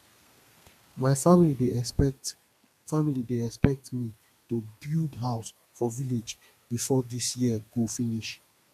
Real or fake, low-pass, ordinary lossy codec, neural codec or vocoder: fake; 14.4 kHz; MP3, 96 kbps; codec, 32 kHz, 1.9 kbps, SNAC